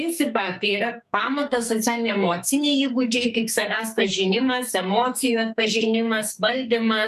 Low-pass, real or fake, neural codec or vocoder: 14.4 kHz; fake; codec, 44.1 kHz, 2.6 kbps, SNAC